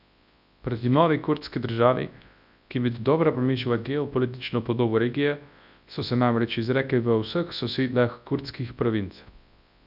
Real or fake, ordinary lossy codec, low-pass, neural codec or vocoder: fake; none; 5.4 kHz; codec, 24 kHz, 0.9 kbps, WavTokenizer, large speech release